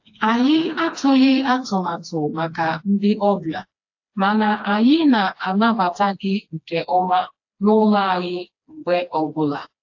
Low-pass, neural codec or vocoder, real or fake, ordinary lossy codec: 7.2 kHz; codec, 16 kHz, 2 kbps, FreqCodec, smaller model; fake; AAC, 48 kbps